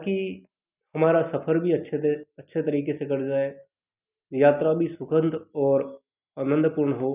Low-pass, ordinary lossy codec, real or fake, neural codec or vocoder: 3.6 kHz; none; real; none